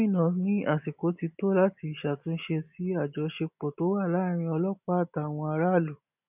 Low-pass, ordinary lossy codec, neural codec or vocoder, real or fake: 3.6 kHz; none; none; real